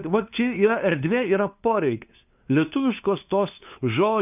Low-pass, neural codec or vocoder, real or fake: 3.6 kHz; codec, 16 kHz, 2 kbps, FunCodec, trained on LibriTTS, 25 frames a second; fake